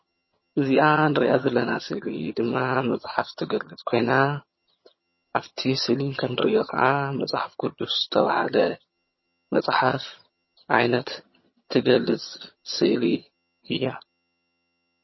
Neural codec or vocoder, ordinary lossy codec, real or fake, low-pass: vocoder, 22.05 kHz, 80 mel bands, HiFi-GAN; MP3, 24 kbps; fake; 7.2 kHz